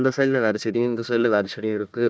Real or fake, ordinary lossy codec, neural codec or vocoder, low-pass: fake; none; codec, 16 kHz, 1 kbps, FunCodec, trained on Chinese and English, 50 frames a second; none